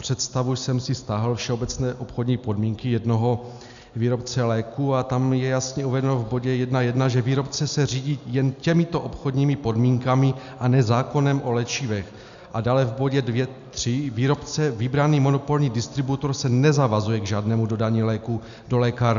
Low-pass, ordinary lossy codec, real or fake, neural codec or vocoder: 7.2 kHz; MP3, 96 kbps; real; none